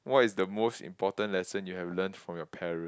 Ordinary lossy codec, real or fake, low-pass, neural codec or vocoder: none; real; none; none